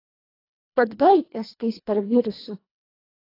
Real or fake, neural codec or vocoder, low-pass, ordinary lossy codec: fake; codec, 24 kHz, 1.5 kbps, HILCodec; 5.4 kHz; AAC, 24 kbps